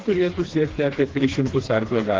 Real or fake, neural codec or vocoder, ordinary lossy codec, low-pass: fake; codec, 16 kHz, 2 kbps, FreqCodec, smaller model; Opus, 16 kbps; 7.2 kHz